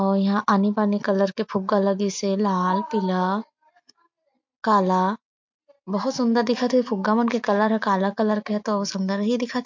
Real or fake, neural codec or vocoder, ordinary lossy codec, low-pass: real; none; MP3, 48 kbps; 7.2 kHz